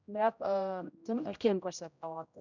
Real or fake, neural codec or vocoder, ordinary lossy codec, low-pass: fake; codec, 16 kHz, 0.5 kbps, X-Codec, HuBERT features, trained on general audio; none; 7.2 kHz